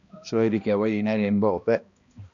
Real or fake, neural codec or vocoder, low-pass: fake; codec, 16 kHz, 1 kbps, X-Codec, HuBERT features, trained on balanced general audio; 7.2 kHz